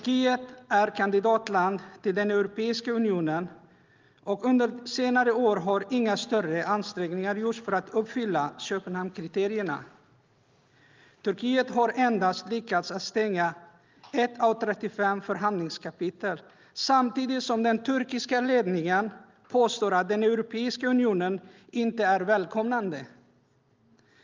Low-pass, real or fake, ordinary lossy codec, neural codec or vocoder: 7.2 kHz; real; Opus, 32 kbps; none